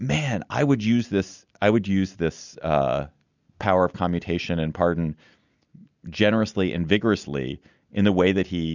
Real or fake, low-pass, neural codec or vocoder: real; 7.2 kHz; none